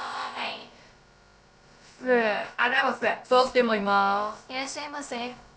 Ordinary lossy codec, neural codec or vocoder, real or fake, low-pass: none; codec, 16 kHz, about 1 kbps, DyCAST, with the encoder's durations; fake; none